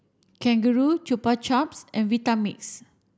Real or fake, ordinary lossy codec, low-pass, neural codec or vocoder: real; none; none; none